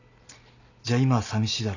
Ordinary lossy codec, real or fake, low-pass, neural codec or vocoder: none; real; 7.2 kHz; none